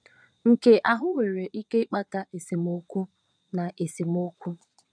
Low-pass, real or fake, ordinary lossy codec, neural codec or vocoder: 9.9 kHz; fake; none; vocoder, 22.05 kHz, 80 mel bands, Vocos